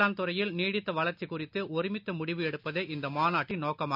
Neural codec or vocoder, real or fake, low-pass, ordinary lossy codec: none; real; 5.4 kHz; none